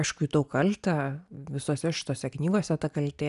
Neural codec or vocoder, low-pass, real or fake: none; 10.8 kHz; real